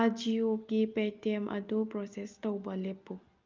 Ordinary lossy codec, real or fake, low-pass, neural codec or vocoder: Opus, 24 kbps; real; 7.2 kHz; none